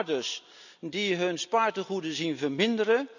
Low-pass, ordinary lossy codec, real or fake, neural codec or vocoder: 7.2 kHz; none; real; none